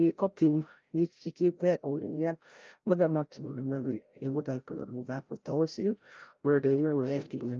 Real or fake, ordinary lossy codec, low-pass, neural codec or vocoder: fake; Opus, 24 kbps; 7.2 kHz; codec, 16 kHz, 0.5 kbps, FreqCodec, larger model